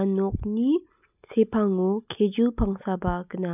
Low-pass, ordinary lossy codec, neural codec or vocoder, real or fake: 3.6 kHz; none; none; real